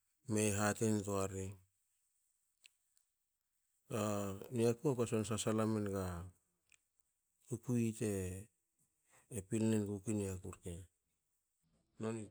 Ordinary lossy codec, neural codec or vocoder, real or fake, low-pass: none; none; real; none